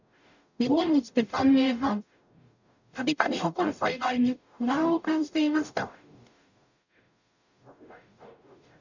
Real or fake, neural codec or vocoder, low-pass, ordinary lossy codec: fake; codec, 44.1 kHz, 0.9 kbps, DAC; 7.2 kHz; none